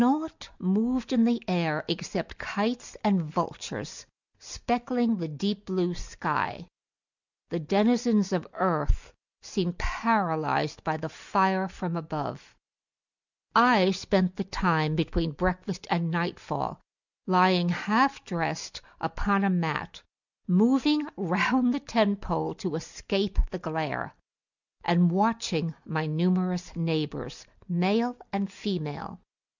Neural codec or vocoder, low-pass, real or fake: none; 7.2 kHz; real